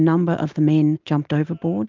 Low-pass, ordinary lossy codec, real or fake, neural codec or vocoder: 7.2 kHz; Opus, 32 kbps; real; none